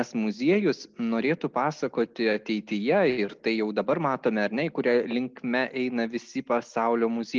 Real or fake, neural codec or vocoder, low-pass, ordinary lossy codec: real; none; 7.2 kHz; Opus, 24 kbps